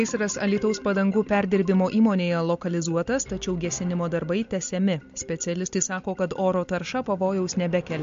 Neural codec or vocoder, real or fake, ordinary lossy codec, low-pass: none; real; MP3, 48 kbps; 7.2 kHz